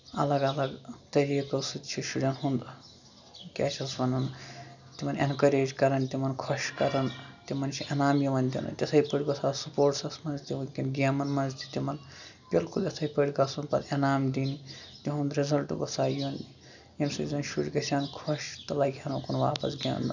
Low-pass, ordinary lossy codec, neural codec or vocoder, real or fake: 7.2 kHz; none; none; real